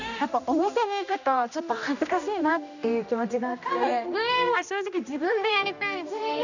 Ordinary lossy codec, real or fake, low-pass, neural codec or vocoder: none; fake; 7.2 kHz; codec, 16 kHz, 1 kbps, X-Codec, HuBERT features, trained on general audio